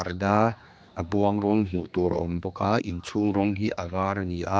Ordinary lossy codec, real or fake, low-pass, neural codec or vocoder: none; fake; none; codec, 16 kHz, 2 kbps, X-Codec, HuBERT features, trained on general audio